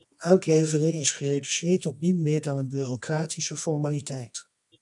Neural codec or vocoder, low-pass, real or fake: codec, 24 kHz, 0.9 kbps, WavTokenizer, medium music audio release; 10.8 kHz; fake